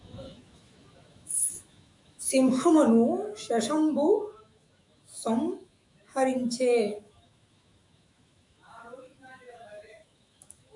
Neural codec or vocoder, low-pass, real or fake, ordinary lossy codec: autoencoder, 48 kHz, 128 numbers a frame, DAC-VAE, trained on Japanese speech; 10.8 kHz; fake; MP3, 96 kbps